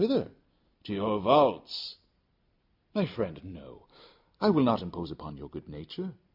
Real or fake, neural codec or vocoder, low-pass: real; none; 5.4 kHz